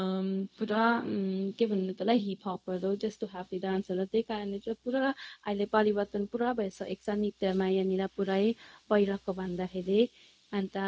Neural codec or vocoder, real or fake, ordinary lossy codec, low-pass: codec, 16 kHz, 0.4 kbps, LongCat-Audio-Codec; fake; none; none